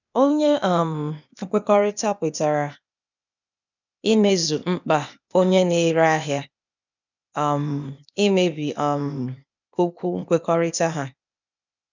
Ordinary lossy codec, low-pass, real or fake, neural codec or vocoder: none; 7.2 kHz; fake; codec, 16 kHz, 0.8 kbps, ZipCodec